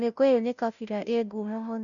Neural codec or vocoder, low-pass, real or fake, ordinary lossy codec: codec, 16 kHz, 0.5 kbps, FunCodec, trained on Chinese and English, 25 frames a second; 7.2 kHz; fake; none